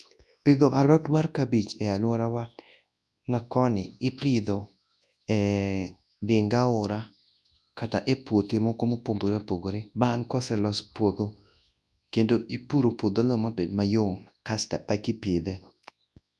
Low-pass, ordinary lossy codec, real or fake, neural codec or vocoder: none; none; fake; codec, 24 kHz, 0.9 kbps, WavTokenizer, large speech release